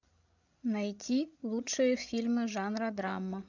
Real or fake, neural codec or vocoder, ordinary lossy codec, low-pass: fake; codec, 16 kHz, 16 kbps, FreqCodec, larger model; MP3, 64 kbps; 7.2 kHz